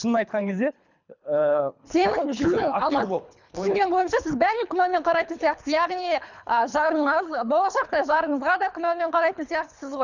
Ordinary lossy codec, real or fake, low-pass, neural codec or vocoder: none; fake; 7.2 kHz; codec, 24 kHz, 3 kbps, HILCodec